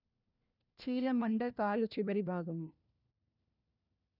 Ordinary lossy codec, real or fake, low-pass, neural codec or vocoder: none; fake; 5.4 kHz; codec, 16 kHz, 1 kbps, FunCodec, trained on LibriTTS, 50 frames a second